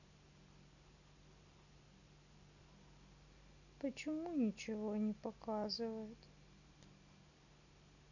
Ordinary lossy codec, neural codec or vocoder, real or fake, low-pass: none; none; real; 7.2 kHz